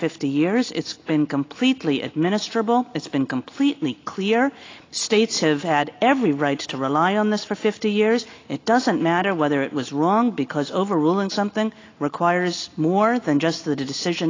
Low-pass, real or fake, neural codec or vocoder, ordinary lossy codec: 7.2 kHz; real; none; AAC, 32 kbps